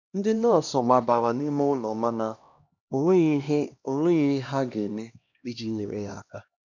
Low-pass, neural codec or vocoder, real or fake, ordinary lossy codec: 7.2 kHz; codec, 16 kHz, 1 kbps, X-Codec, HuBERT features, trained on LibriSpeech; fake; none